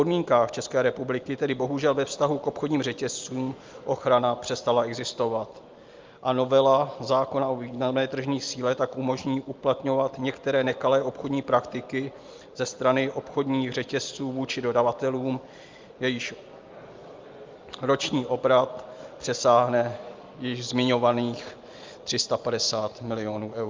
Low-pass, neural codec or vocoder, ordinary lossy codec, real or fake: 7.2 kHz; none; Opus, 32 kbps; real